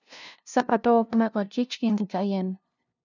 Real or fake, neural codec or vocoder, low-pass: fake; codec, 16 kHz, 0.5 kbps, FunCodec, trained on LibriTTS, 25 frames a second; 7.2 kHz